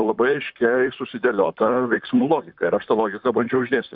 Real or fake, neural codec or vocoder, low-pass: fake; vocoder, 44.1 kHz, 128 mel bands, Pupu-Vocoder; 5.4 kHz